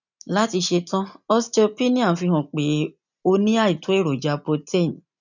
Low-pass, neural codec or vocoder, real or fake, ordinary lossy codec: 7.2 kHz; none; real; none